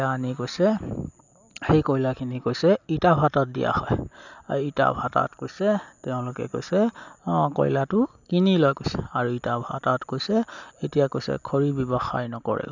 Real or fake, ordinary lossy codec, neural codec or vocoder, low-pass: real; none; none; 7.2 kHz